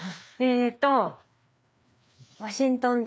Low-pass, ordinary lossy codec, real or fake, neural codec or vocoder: none; none; fake; codec, 16 kHz, 2 kbps, FreqCodec, larger model